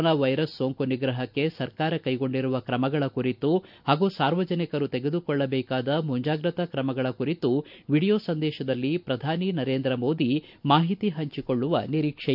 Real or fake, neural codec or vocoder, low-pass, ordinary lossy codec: real; none; 5.4 kHz; none